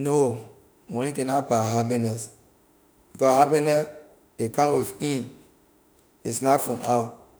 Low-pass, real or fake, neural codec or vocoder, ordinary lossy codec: none; fake; autoencoder, 48 kHz, 32 numbers a frame, DAC-VAE, trained on Japanese speech; none